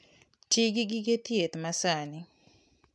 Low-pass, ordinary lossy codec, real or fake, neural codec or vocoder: none; none; real; none